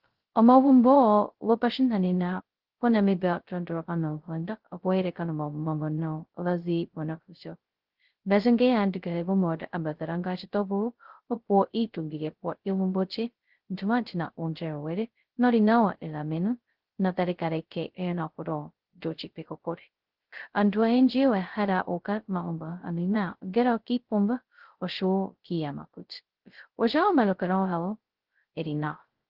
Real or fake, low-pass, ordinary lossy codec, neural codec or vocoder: fake; 5.4 kHz; Opus, 16 kbps; codec, 16 kHz, 0.2 kbps, FocalCodec